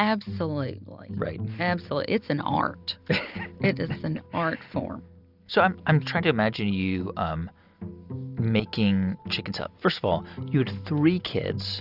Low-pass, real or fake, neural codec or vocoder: 5.4 kHz; real; none